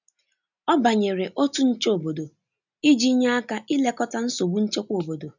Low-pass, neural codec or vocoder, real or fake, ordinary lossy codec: 7.2 kHz; none; real; none